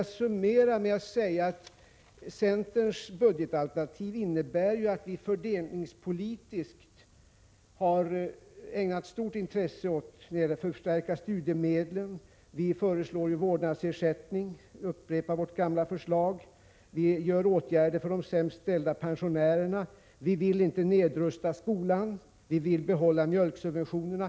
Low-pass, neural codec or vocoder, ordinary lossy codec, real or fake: none; none; none; real